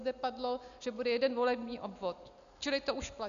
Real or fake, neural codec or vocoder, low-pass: real; none; 7.2 kHz